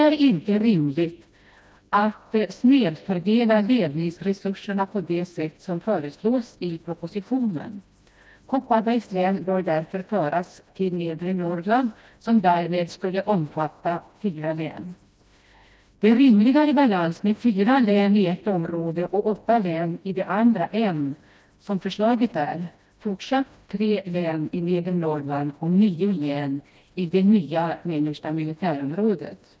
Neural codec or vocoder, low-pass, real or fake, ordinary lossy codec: codec, 16 kHz, 1 kbps, FreqCodec, smaller model; none; fake; none